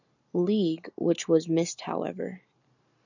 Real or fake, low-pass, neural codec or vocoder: real; 7.2 kHz; none